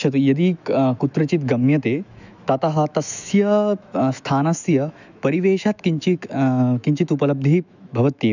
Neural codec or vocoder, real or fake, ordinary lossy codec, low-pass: autoencoder, 48 kHz, 128 numbers a frame, DAC-VAE, trained on Japanese speech; fake; none; 7.2 kHz